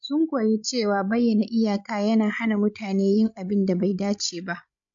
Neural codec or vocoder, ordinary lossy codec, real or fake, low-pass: codec, 16 kHz, 16 kbps, FreqCodec, larger model; AAC, 64 kbps; fake; 7.2 kHz